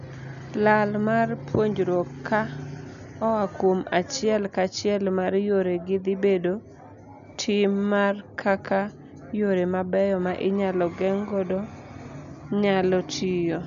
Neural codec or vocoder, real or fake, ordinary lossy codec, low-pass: none; real; none; 7.2 kHz